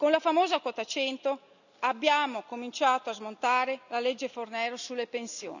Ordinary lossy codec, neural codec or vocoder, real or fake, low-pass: none; none; real; 7.2 kHz